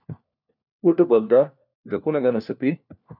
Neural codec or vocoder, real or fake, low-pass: codec, 16 kHz, 1 kbps, FunCodec, trained on LibriTTS, 50 frames a second; fake; 5.4 kHz